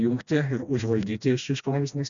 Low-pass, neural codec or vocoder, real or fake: 7.2 kHz; codec, 16 kHz, 1 kbps, FreqCodec, smaller model; fake